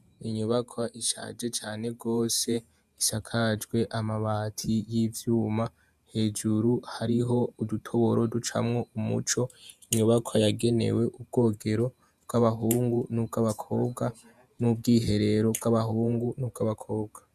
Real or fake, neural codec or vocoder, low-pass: fake; vocoder, 48 kHz, 128 mel bands, Vocos; 14.4 kHz